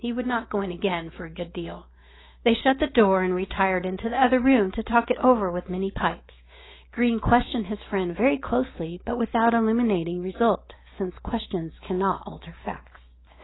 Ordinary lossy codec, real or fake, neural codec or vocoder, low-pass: AAC, 16 kbps; fake; autoencoder, 48 kHz, 128 numbers a frame, DAC-VAE, trained on Japanese speech; 7.2 kHz